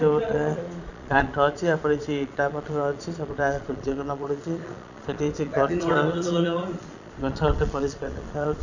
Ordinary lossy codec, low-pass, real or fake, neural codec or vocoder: none; 7.2 kHz; fake; vocoder, 22.05 kHz, 80 mel bands, Vocos